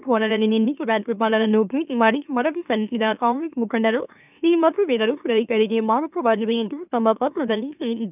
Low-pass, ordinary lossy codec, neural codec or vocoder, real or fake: 3.6 kHz; none; autoencoder, 44.1 kHz, a latent of 192 numbers a frame, MeloTTS; fake